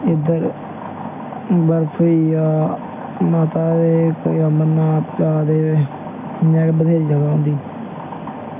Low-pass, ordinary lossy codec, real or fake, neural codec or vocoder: 3.6 kHz; MP3, 32 kbps; real; none